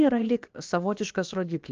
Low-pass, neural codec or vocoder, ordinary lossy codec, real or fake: 7.2 kHz; codec, 16 kHz, about 1 kbps, DyCAST, with the encoder's durations; Opus, 24 kbps; fake